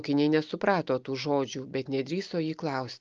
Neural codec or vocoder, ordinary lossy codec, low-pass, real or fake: none; Opus, 32 kbps; 7.2 kHz; real